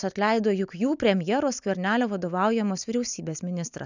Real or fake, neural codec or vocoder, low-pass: real; none; 7.2 kHz